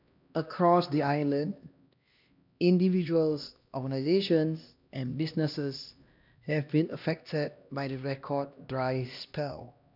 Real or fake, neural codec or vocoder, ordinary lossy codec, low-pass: fake; codec, 16 kHz, 1 kbps, X-Codec, HuBERT features, trained on LibriSpeech; none; 5.4 kHz